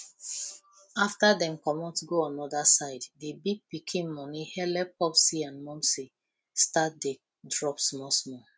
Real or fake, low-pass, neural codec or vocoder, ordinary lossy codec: real; none; none; none